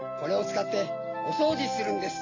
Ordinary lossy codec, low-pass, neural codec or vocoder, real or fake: AAC, 32 kbps; 7.2 kHz; none; real